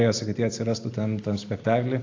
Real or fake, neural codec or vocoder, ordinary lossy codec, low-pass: real; none; AAC, 48 kbps; 7.2 kHz